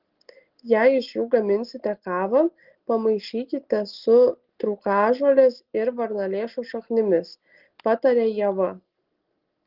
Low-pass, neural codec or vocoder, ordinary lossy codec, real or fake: 5.4 kHz; none; Opus, 16 kbps; real